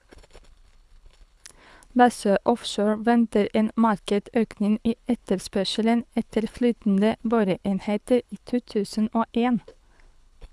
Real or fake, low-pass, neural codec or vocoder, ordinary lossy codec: fake; none; codec, 24 kHz, 6 kbps, HILCodec; none